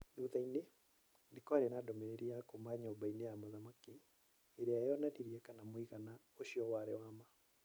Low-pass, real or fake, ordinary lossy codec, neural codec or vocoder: none; real; none; none